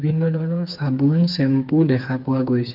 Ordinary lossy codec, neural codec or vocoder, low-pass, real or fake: Opus, 24 kbps; codec, 16 kHz, 4 kbps, FreqCodec, smaller model; 5.4 kHz; fake